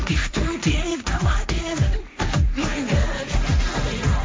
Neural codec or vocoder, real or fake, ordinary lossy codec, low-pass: codec, 16 kHz, 1.1 kbps, Voila-Tokenizer; fake; none; none